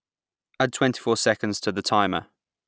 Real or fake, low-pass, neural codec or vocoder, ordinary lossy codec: real; none; none; none